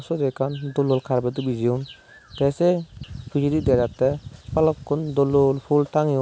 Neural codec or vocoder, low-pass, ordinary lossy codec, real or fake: none; none; none; real